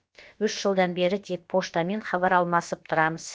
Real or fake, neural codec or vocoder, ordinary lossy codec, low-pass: fake; codec, 16 kHz, about 1 kbps, DyCAST, with the encoder's durations; none; none